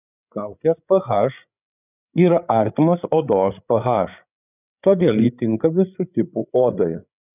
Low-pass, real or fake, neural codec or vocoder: 3.6 kHz; fake; codec, 16 kHz, 8 kbps, FreqCodec, larger model